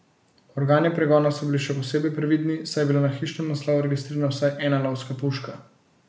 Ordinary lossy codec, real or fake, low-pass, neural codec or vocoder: none; real; none; none